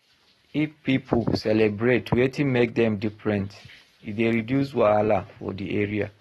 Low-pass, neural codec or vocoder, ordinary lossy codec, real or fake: 19.8 kHz; none; AAC, 32 kbps; real